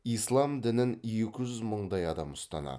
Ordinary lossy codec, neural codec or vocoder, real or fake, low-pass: none; none; real; none